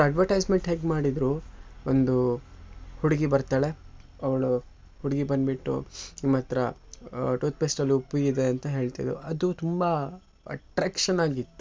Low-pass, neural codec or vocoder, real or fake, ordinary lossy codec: none; none; real; none